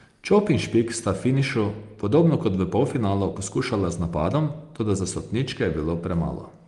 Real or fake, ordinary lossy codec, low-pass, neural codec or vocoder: real; Opus, 32 kbps; 10.8 kHz; none